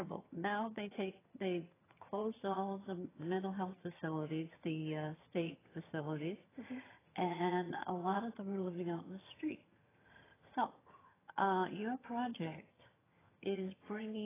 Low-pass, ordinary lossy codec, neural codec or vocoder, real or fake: 3.6 kHz; AAC, 16 kbps; vocoder, 22.05 kHz, 80 mel bands, HiFi-GAN; fake